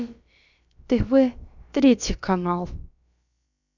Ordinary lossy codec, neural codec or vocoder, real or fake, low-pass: none; codec, 16 kHz, about 1 kbps, DyCAST, with the encoder's durations; fake; 7.2 kHz